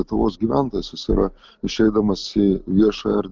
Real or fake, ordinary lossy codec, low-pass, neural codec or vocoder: real; Opus, 24 kbps; 7.2 kHz; none